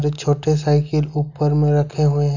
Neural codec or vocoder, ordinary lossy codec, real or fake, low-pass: none; none; real; 7.2 kHz